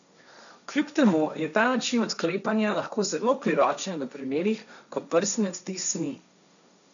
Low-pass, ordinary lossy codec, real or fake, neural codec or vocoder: 7.2 kHz; none; fake; codec, 16 kHz, 1.1 kbps, Voila-Tokenizer